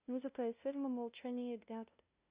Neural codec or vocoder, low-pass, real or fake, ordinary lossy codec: codec, 16 kHz, 0.5 kbps, FunCodec, trained on LibriTTS, 25 frames a second; 3.6 kHz; fake; Opus, 64 kbps